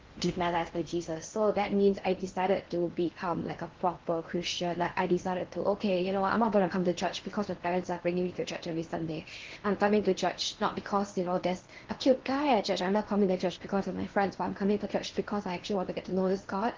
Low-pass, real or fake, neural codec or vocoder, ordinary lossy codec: 7.2 kHz; fake; codec, 16 kHz in and 24 kHz out, 0.6 kbps, FocalCodec, streaming, 4096 codes; Opus, 16 kbps